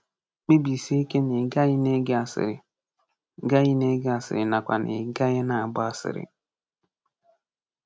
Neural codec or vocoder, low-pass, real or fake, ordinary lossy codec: none; none; real; none